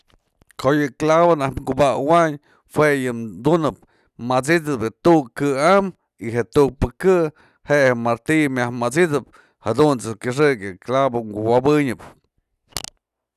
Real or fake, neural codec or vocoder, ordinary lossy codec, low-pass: real; none; none; 14.4 kHz